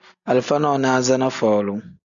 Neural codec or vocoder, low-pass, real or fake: none; 7.2 kHz; real